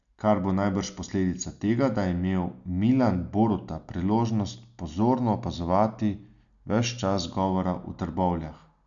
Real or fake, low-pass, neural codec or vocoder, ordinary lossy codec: real; 7.2 kHz; none; none